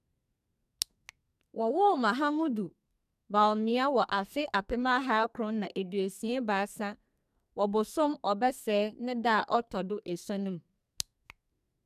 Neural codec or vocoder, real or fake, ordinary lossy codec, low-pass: codec, 44.1 kHz, 2.6 kbps, SNAC; fake; none; 14.4 kHz